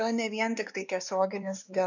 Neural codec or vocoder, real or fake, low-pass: codec, 16 kHz, 2 kbps, X-Codec, WavLM features, trained on Multilingual LibriSpeech; fake; 7.2 kHz